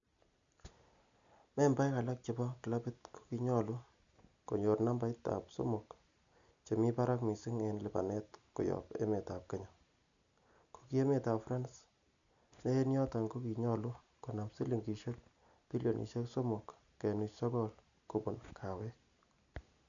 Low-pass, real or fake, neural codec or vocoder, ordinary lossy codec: 7.2 kHz; real; none; none